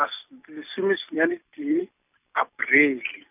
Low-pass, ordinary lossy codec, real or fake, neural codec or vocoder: 3.6 kHz; none; real; none